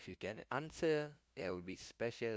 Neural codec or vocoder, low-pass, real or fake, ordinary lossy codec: codec, 16 kHz, 0.5 kbps, FunCodec, trained on LibriTTS, 25 frames a second; none; fake; none